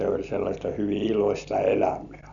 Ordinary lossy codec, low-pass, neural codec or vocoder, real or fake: none; 7.2 kHz; none; real